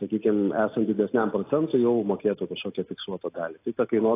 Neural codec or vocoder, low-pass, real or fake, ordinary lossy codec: none; 3.6 kHz; real; AAC, 24 kbps